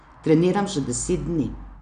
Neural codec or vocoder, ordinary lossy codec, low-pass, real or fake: none; none; 9.9 kHz; real